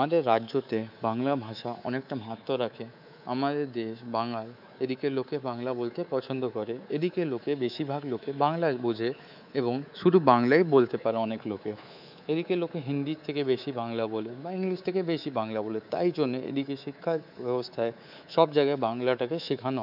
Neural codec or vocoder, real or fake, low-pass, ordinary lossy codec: codec, 24 kHz, 3.1 kbps, DualCodec; fake; 5.4 kHz; none